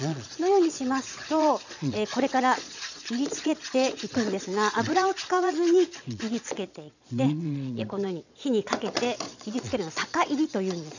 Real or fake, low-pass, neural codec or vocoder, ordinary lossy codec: fake; 7.2 kHz; vocoder, 22.05 kHz, 80 mel bands, Vocos; none